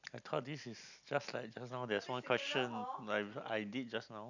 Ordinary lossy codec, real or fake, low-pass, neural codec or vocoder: none; real; 7.2 kHz; none